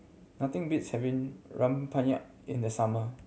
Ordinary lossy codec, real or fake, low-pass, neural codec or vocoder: none; real; none; none